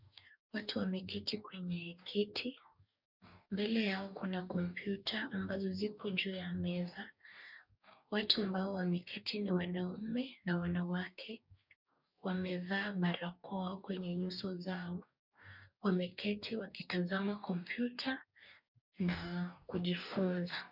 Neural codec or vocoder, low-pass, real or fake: codec, 44.1 kHz, 2.6 kbps, DAC; 5.4 kHz; fake